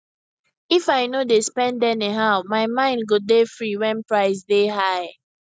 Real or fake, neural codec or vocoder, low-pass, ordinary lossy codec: real; none; none; none